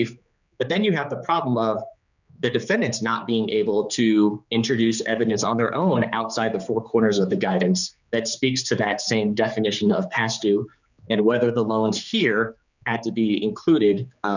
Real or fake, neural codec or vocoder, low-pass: fake; codec, 16 kHz, 4 kbps, X-Codec, HuBERT features, trained on general audio; 7.2 kHz